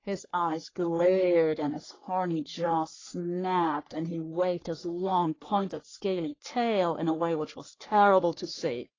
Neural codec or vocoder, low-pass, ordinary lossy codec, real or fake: codec, 44.1 kHz, 3.4 kbps, Pupu-Codec; 7.2 kHz; AAC, 32 kbps; fake